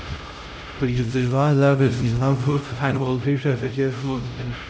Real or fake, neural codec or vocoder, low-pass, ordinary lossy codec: fake; codec, 16 kHz, 0.5 kbps, X-Codec, HuBERT features, trained on LibriSpeech; none; none